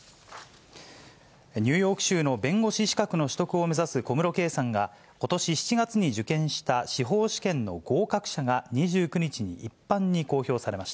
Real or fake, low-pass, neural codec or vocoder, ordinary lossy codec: real; none; none; none